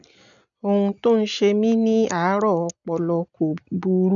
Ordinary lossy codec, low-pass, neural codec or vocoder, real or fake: none; 7.2 kHz; none; real